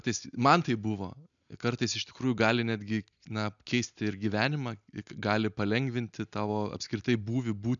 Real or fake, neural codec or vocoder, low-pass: real; none; 7.2 kHz